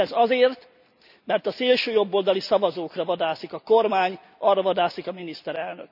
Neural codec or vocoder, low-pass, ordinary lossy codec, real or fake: none; 5.4 kHz; none; real